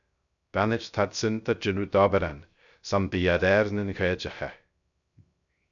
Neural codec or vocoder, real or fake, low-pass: codec, 16 kHz, 0.3 kbps, FocalCodec; fake; 7.2 kHz